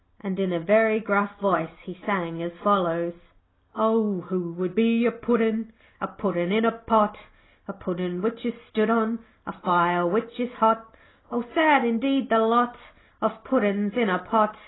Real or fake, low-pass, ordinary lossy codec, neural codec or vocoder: real; 7.2 kHz; AAC, 16 kbps; none